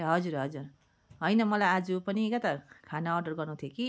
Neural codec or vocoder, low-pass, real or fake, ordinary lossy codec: none; none; real; none